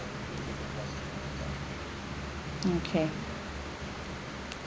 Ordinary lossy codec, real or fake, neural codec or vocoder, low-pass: none; real; none; none